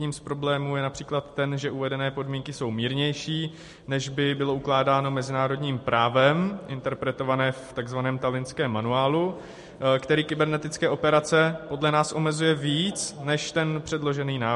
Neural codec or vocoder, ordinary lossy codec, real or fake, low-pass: none; MP3, 48 kbps; real; 14.4 kHz